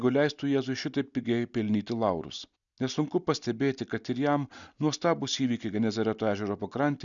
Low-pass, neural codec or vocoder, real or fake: 7.2 kHz; none; real